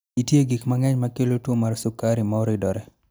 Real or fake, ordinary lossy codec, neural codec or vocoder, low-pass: real; none; none; none